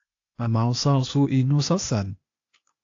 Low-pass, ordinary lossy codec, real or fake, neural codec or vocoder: 7.2 kHz; AAC, 48 kbps; fake; codec, 16 kHz, 0.8 kbps, ZipCodec